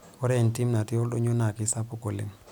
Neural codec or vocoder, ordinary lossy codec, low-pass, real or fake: none; none; none; real